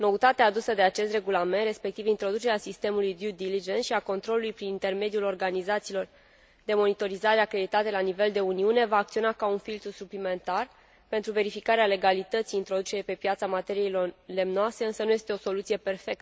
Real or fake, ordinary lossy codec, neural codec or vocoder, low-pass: real; none; none; none